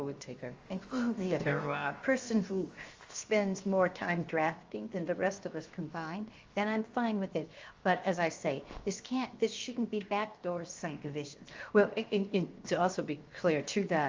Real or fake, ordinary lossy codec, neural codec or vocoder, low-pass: fake; Opus, 32 kbps; codec, 16 kHz, 0.7 kbps, FocalCodec; 7.2 kHz